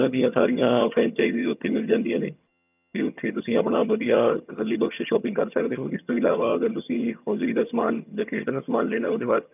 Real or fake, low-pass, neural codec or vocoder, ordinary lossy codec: fake; 3.6 kHz; vocoder, 22.05 kHz, 80 mel bands, HiFi-GAN; none